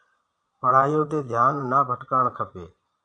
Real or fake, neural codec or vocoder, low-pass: fake; vocoder, 22.05 kHz, 80 mel bands, Vocos; 9.9 kHz